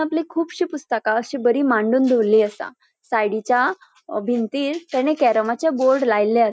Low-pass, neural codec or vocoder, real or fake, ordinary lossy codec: none; none; real; none